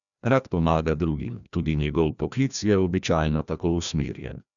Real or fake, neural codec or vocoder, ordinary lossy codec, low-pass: fake; codec, 16 kHz, 1 kbps, FreqCodec, larger model; none; 7.2 kHz